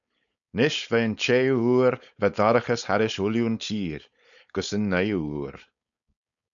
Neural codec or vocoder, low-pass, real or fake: codec, 16 kHz, 4.8 kbps, FACodec; 7.2 kHz; fake